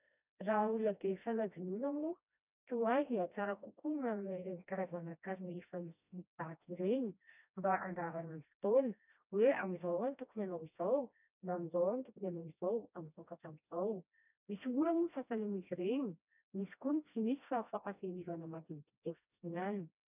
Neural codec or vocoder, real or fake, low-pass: codec, 16 kHz, 1 kbps, FreqCodec, smaller model; fake; 3.6 kHz